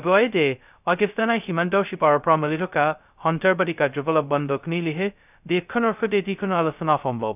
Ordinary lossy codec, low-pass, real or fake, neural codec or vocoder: none; 3.6 kHz; fake; codec, 16 kHz, 0.2 kbps, FocalCodec